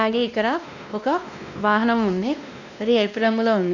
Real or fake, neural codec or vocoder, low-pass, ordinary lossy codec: fake; codec, 16 kHz, 1 kbps, X-Codec, WavLM features, trained on Multilingual LibriSpeech; 7.2 kHz; none